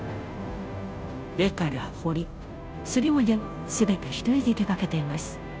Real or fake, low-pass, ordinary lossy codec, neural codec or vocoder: fake; none; none; codec, 16 kHz, 0.5 kbps, FunCodec, trained on Chinese and English, 25 frames a second